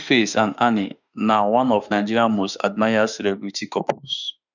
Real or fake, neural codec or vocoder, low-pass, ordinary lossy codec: fake; autoencoder, 48 kHz, 32 numbers a frame, DAC-VAE, trained on Japanese speech; 7.2 kHz; none